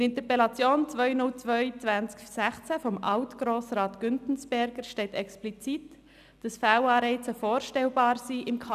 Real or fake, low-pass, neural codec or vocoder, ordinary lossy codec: real; 14.4 kHz; none; none